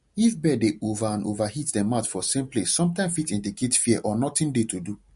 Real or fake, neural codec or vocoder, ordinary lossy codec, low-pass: real; none; MP3, 48 kbps; 10.8 kHz